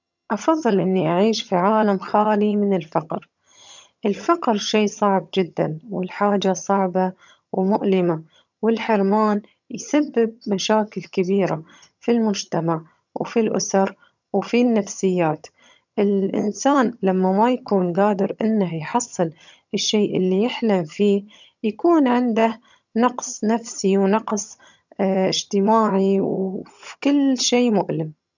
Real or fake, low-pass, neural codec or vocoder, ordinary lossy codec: fake; 7.2 kHz; vocoder, 22.05 kHz, 80 mel bands, HiFi-GAN; none